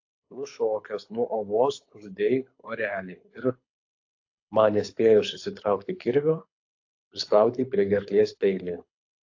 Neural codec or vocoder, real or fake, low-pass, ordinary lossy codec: codec, 24 kHz, 6 kbps, HILCodec; fake; 7.2 kHz; AAC, 48 kbps